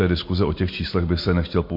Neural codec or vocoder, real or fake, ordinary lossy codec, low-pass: none; real; MP3, 32 kbps; 5.4 kHz